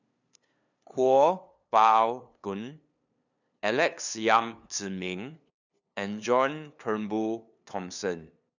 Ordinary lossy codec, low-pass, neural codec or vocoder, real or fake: none; 7.2 kHz; codec, 16 kHz, 2 kbps, FunCodec, trained on LibriTTS, 25 frames a second; fake